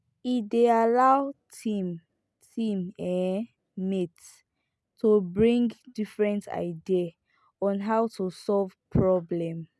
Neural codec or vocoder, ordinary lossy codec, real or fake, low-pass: none; none; real; none